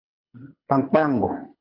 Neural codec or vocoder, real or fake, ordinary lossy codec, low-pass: codec, 24 kHz, 6 kbps, HILCodec; fake; AAC, 24 kbps; 5.4 kHz